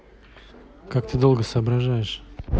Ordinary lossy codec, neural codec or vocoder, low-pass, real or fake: none; none; none; real